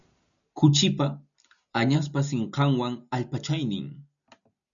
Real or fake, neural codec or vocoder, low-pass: real; none; 7.2 kHz